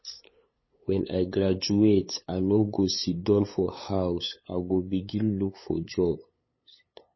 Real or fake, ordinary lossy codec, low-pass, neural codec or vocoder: fake; MP3, 24 kbps; 7.2 kHz; codec, 16 kHz, 8 kbps, FunCodec, trained on LibriTTS, 25 frames a second